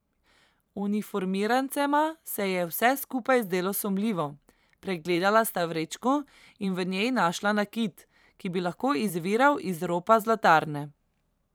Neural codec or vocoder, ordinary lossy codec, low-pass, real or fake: vocoder, 44.1 kHz, 128 mel bands every 512 samples, BigVGAN v2; none; none; fake